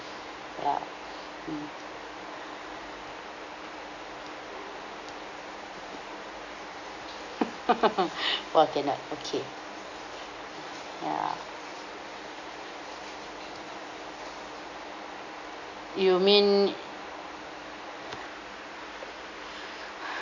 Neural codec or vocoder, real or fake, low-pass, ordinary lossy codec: none; real; 7.2 kHz; AAC, 48 kbps